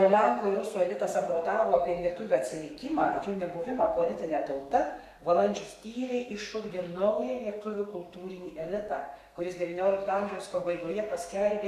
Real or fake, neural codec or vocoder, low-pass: fake; codec, 32 kHz, 1.9 kbps, SNAC; 14.4 kHz